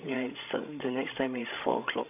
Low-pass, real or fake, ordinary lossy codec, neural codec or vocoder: 3.6 kHz; fake; none; codec, 16 kHz, 16 kbps, FreqCodec, larger model